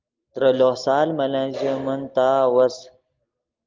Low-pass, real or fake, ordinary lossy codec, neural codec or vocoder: 7.2 kHz; real; Opus, 32 kbps; none